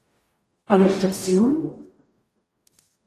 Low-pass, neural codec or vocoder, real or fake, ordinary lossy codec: 14.4 kHz; codec, 44.1 kHz, 0.9 kbps, DAC; fake; AAC, 48 kbps